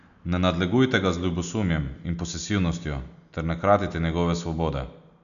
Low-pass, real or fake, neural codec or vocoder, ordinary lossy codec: 7.2 kHz; real; none; none